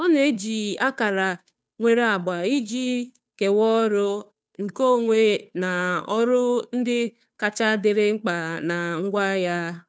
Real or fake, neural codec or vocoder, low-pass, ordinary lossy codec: fake; codec, 16 kHz, 2 kbps, FunCodec, trained on Chinese and English, 25 frames a second; none; none